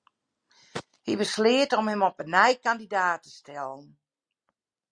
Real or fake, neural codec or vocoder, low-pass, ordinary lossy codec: real; none; 9.9 kHz; Opus, 64 kbps